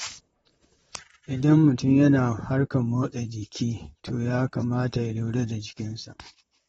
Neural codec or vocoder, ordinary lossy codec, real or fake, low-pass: vocoder, 44.1 kHz, 128 mel bands, Pupu-Vocoder; AAC, 24 kbps; fake; 19.8 kHz